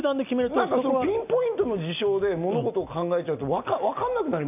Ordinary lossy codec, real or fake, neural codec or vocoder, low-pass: none; real; none; 3.6 kHz